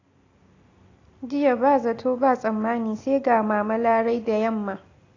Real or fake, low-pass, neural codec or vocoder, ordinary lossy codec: real; 7.2 kHz; none; AAC, 32 kbps